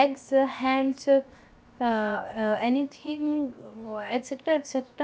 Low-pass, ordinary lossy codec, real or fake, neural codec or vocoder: none; none; fake; codec, 16 kHz, 0.7 kbps, FocalCodec